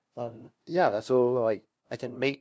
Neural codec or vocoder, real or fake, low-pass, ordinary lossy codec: codec, 16 kHz, 0.5 kbps, FunCodec, trained on LibriTTS, 25 frames a second; fake; none; none